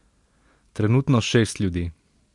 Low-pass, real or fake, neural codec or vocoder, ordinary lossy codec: 10.8 kHz; real; none; MP3, 64 kbps